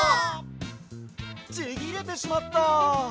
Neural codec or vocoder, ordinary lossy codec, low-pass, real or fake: none; none; none; real